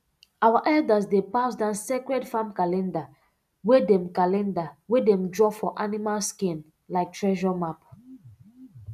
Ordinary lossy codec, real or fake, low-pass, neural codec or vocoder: none; real; 14.4 kHz; none